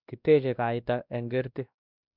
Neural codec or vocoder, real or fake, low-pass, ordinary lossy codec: codec, 16 kHz, 1 kbps, X-Codec, WavLM features, trained on Multilingual LibriSpeech; fake; 5.4 kHz; none